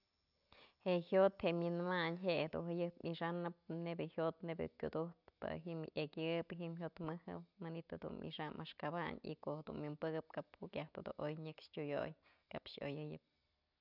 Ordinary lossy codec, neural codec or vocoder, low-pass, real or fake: none; none; 5.4 kHz; real